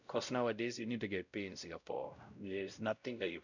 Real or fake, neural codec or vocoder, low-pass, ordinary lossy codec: fake; codec, 16 kHz, 0.5 kbps, X-Codec, WavLM features, trained on Multilingual LibriSpeech; 7.2 kHz; none